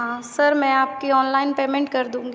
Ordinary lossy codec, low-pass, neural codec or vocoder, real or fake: none; none; none; real